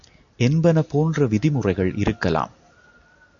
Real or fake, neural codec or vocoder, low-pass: real; none; 7.2 kHz